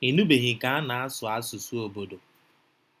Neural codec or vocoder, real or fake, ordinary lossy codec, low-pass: none; real; none; 14.4 kHz